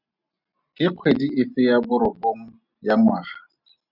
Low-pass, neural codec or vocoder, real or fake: 5.4 kHz; none; real